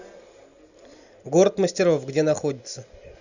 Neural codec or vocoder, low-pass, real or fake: none; 7.2 kHz; real